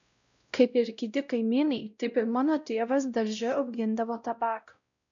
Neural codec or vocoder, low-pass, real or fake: codec, 16 kHz, 0.5 kbps, X-Codec, WavLM features, trained on Multilingual LibriSpeech; 7.2 kHz; fake